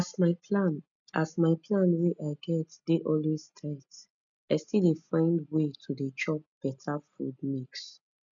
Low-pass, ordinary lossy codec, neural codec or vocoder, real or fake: 7.2 kHz; none; none; real